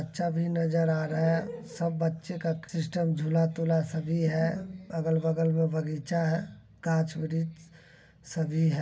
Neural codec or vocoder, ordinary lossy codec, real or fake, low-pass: none; none; real; none